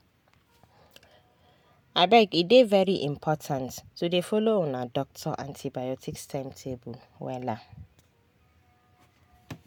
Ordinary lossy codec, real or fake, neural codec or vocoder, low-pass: MP3, 96 kbps; real; none; 19.8 kHz